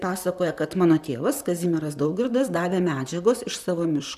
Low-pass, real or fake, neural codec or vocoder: 14.4 kHz; fake; vocoder, 44.1 kHz, 128 mel bands, Pupu-Vocoder